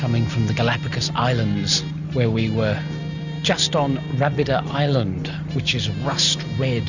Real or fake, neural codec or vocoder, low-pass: real; none; 7.2 kHz